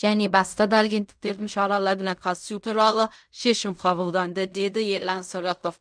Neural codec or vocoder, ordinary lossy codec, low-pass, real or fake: codec, 16 kHz in and 24 kHz out, 0.4 kbps, LongCat-Audio-Codec, fine tuned four codebook decoder; none; 9.9 kHz; fake